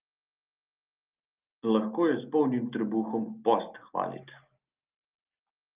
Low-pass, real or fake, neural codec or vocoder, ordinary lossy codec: 3.6 kHz; real; none; Opus, 24 kbps